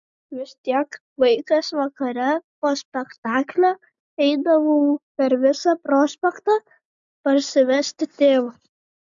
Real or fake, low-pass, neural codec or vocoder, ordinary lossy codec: real; 7.2 kHz; none; MP3, 64 kbps